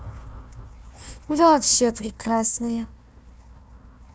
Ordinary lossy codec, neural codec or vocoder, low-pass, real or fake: none; codec, 16 kHz, 1 kbps, FunCodec, trained on Chinese and English, 50 frames a second; none; fake